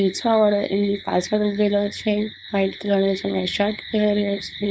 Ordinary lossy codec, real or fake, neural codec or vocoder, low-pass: none; fake; codec, 16 kHz, 4.8 kbps, FACodec; none